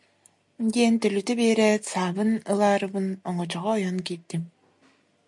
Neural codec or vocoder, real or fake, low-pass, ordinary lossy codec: none; real; 10.8 kHz; MP3, 64 kbps